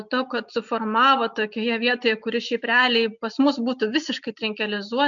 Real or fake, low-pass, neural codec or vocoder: real; 7.2 kHz; none